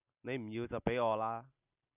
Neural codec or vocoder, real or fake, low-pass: none; real; 3.6 kHz